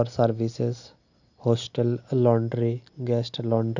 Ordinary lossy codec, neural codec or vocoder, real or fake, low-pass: none; none; real; 7.2 kHz